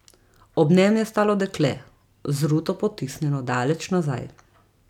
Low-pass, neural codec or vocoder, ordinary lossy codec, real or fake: 19.8 kHz; none; none; real